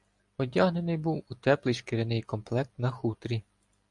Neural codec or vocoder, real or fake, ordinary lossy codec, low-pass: none; real; AAC, 64 kbps; 10.8 kHz